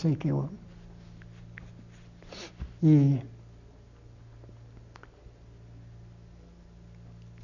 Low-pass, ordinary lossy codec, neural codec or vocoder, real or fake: 7.2 kHz; none; none; real